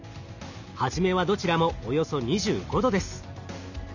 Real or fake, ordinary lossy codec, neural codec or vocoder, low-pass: real; none; none; 7.2 kHz